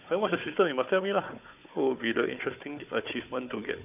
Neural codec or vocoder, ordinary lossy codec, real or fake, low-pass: codec, 16 kHz, 4 kbps, FunCodec, trained on Chinese and English, 50 frames a second; none; fake; 3.6 kHz